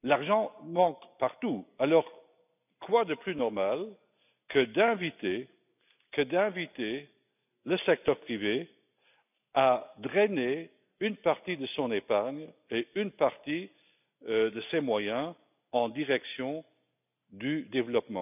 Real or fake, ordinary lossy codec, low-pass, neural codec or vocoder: real; none; 3.6 kHz; none